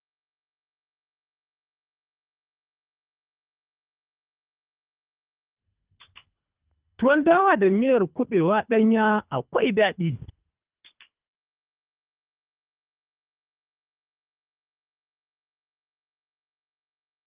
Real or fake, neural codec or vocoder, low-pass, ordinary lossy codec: fake; codec, 24 kHz, 3 kbps, HILCodec; 3.6 kHz; Opus, 24 kbps